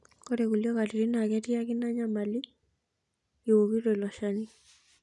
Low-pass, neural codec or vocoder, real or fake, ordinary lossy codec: 10.8 kHz; none; real; none